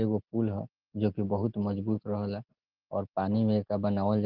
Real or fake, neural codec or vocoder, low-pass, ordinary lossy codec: real; none; 5.4 kHz; Opus, 16 kbps